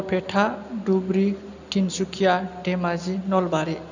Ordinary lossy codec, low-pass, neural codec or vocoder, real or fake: none; 7.2 kHz; none; real